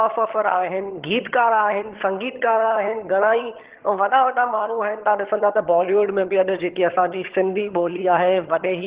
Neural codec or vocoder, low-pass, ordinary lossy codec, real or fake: vocoder, 22.05 kHz, 80 mel bands, HiFi-GAN; 3.6 kHz; Opus, 16 kbps; fake